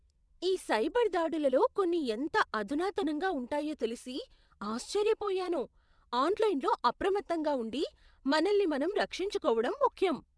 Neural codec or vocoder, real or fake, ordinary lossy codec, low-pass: vocoder, 22.05 kHz, 80 mel bands, WaveNeXt; fake; none; none